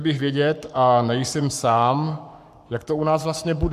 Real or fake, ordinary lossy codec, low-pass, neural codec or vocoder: fake; MP3, 96 kbps; 14.4 kHz; codec, 44.1 kHz, 7.8 kbps, Pupu-Codec